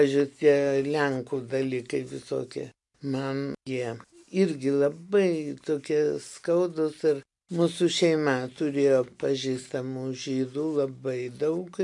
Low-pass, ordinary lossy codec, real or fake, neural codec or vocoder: 10.8 kHz; MP3, 64 kbps; real; none